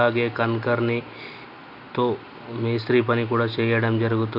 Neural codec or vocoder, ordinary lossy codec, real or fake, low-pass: none; none; real; 5.4 kHz